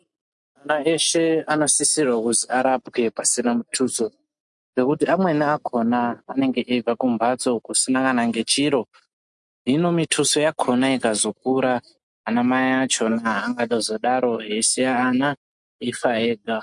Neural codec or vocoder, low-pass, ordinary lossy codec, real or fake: none; 10.8 kHz; MP3, 64 kbps; real